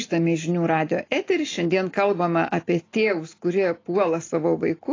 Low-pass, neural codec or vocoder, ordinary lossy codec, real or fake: 7.2 kHz; none; AAC, 32 kbps; real